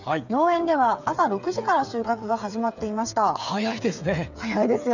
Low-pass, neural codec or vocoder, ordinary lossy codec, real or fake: 7.2 kHz; codec, 16 kHz, 8 kbps, FreqCodec, smaller model; none; fake